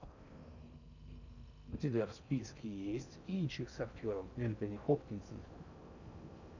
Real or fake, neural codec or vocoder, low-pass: fake; codec, 16 kHz in and 24 kHz out, 0.6 kbps, FocalCodec, streaming, 4096 codes; 7.2 kHz